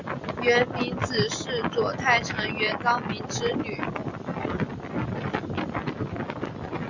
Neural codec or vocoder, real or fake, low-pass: none; real; 7.2 kHz